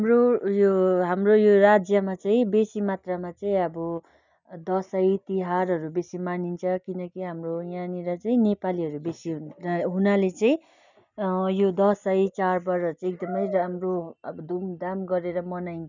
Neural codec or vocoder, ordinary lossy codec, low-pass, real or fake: none; none; 7.2 kHz; real